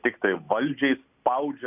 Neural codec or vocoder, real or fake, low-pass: none; real; 3.6 kHz